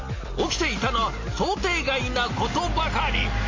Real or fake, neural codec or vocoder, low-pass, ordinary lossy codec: real; none; 7.2 kHz; MP3, 32 kbps